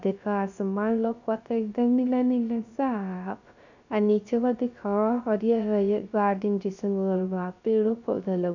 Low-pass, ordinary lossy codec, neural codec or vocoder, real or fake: 7.2 kHz; none; codec, 16 kHz, 0.3 kbps, FocalCodec; fake